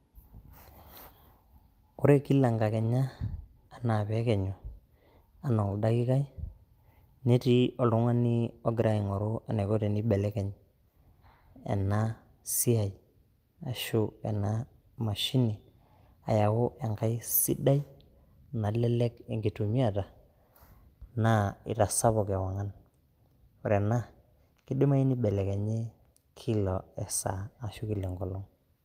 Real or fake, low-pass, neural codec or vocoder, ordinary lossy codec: real; 14.4 kHz; none; Opus, 32 kbps